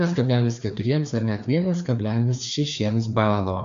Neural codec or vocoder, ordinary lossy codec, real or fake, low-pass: codec, 16 kHz, 2 kbps, FreqCodec, larger model; AAC, 64 kbps; fake; 7.2 kHz